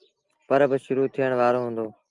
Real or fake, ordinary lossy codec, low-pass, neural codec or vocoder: real; Opus, 32 kbps; 10.8 kHz; none